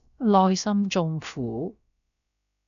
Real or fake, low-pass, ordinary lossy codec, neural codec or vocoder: fake; 7.2 kHz; AAC, 96 kbps; codec, 16 kHz, about 1 kbps, DyCAST, with the encoder's durations